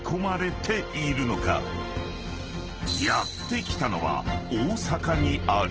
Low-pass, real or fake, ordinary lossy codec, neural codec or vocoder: 7.2 kHz; real; Opus, 16 kbps; none